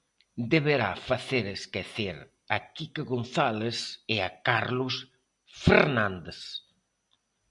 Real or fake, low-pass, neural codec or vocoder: real; 10.8 kHz; none